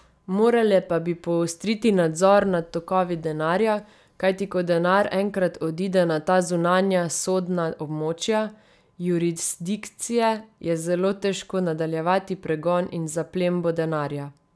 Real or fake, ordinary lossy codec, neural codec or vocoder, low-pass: real; none; none; none